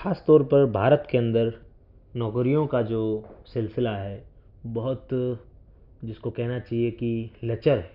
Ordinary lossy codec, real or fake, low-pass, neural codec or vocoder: none; real; 5.4 kHz; none